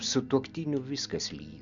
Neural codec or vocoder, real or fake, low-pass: none; real; 7.2 kHz